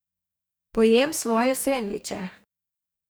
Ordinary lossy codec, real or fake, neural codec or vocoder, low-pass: none; fake; codec, 44.1 kHz, 2.6 kbps, DAC; none